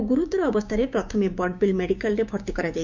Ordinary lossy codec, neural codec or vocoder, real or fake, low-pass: none; codec, 16 kHz, 6 kbps, DAC; fake; 7.2 kHz